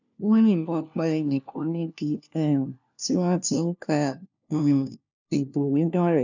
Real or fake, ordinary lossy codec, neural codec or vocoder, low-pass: fake; none; codec, 16 kHz, 1 kbps, FunCodec, trained on LibriTTS, 50 frames a second; 7.2 kHz